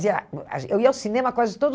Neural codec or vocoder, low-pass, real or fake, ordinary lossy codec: none; none; real; none